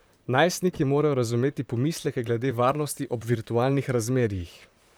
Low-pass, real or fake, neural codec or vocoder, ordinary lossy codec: none; fake; vocoder, 44.1 kHz, 128 mel bands, Pupu-Vocoder; none